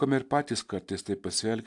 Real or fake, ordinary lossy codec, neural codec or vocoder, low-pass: real; AAC, 64 kbps; none; 10.8 kHz